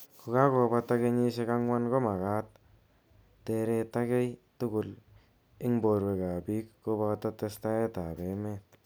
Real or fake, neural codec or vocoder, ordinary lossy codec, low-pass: real; none; none; none